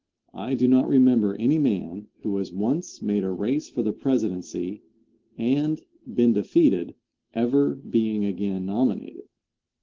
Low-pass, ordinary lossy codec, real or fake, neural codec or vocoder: 7.2 kHz; Opus, 16 kbps; real; none